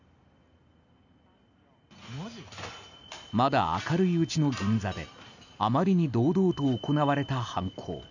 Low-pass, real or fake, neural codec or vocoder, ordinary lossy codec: 7.2 kHz; real; none; none